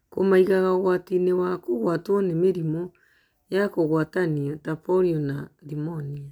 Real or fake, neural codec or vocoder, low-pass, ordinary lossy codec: real; none; 19.8 kHz; none